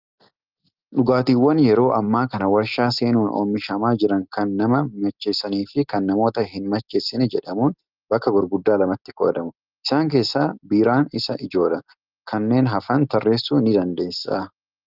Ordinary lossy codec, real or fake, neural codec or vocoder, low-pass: Opus, 32 kbps; real; none; 5.4 kHz